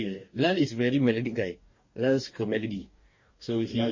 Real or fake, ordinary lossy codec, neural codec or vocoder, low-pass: fake; MP3, 32 kbps; codec, 44.1 kHz, 2.6 kbps, DAC; 7.2 kHz